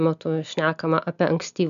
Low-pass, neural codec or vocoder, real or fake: 7.2 kHz; none; real